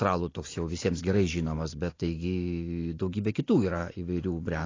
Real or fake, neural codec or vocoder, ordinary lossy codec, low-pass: real; none; AAC, 32 kbps; 7.2 kHz